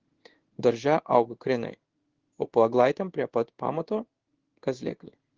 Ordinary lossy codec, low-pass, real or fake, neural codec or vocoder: Opus, 16 kbps; 7.2 kHz; fake; codec, 16 kHz in and 24 kHz out, 1 kbps, XY-Tokenizer